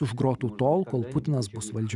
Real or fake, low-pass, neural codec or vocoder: real; 10.8 kHz; none